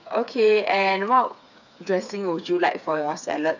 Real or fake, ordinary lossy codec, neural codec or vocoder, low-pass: fake; none; codec, 16 kHz, 4 kbps, FreqCodec, smaller model; 7.2 kHz